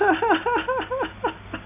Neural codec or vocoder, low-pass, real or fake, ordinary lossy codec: none; 3.6 kHz; real; none